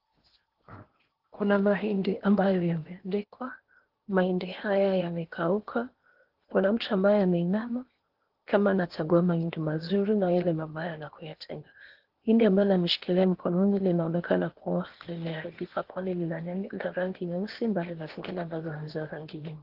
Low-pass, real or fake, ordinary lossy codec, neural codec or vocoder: 5.4 kHz; fake; Opus, 16 kbps; codec, 16 kHz in and 24 kHz out, 0.8 kbps, FocalCodec, streaming, 65536 codes